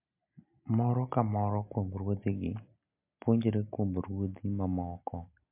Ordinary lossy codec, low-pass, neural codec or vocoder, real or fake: MP3, 32 kbps; 3.6 kHz; none; real